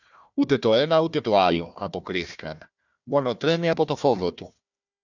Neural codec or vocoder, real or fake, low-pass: codec, 16 kHz, 1 kbps, FunCodec, trained on Chinese and English, 50 frames a second; fake; 7.2 kHz